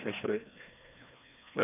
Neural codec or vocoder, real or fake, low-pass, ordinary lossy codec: codec, 16 kHz, 1 kbps, FreqCodec, larger model; fake; 3.6 kHz; none